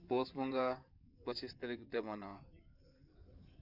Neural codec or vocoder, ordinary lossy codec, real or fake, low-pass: codec, 16 kHz in and 24 kHz out, 2.2 kbps, FireRedTTS-2 codec; none; fake; 5.4 kHz